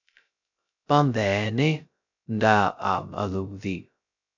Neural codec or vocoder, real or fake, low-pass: codec, 16 kHz, 0.2 kbps, FocalCodec; fake; 7.2 kHz